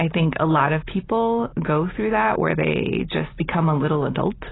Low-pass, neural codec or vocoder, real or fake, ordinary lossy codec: 7.2 kHz; none; real; AAC, 16 kbps